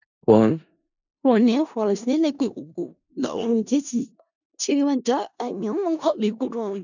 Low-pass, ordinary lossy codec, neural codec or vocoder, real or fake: 7.2 kHz; none; codec, 16 kHz in and 24 kHz out, 0.4 kbps, LongCat-Audio-Codec, four codebook decoder; fake